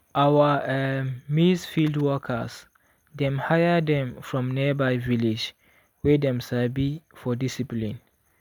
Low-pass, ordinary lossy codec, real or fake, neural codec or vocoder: none; none; real; none